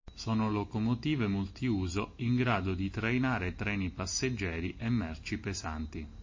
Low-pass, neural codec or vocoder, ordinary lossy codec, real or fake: 7.2 kHz; none; MP3, 32 kbps; real